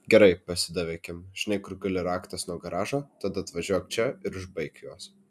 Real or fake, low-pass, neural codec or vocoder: real; 14.4 kHz; none